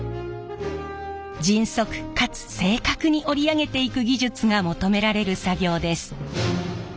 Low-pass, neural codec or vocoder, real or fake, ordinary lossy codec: none; none; real; none